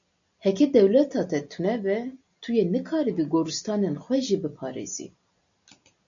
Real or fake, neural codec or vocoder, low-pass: real; none; 7.2 kHz